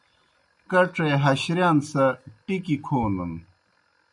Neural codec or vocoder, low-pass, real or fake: none; 10.8 kHz; real